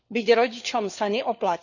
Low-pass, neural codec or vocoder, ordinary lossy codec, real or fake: 7.2 kHz; codec, 16 kHz, 4 kbps, FunCodec, trained on LibriTTS, 50 frames a second; none; fake